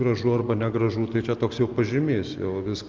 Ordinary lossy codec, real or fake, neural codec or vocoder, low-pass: Opus, 24 kbps; real; none; 7.2 kHz